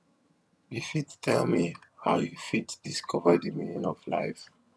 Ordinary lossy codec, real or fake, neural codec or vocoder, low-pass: none; fake; vocoder, 22.05 kHz, 80 mel bands, HiFi-GAN; none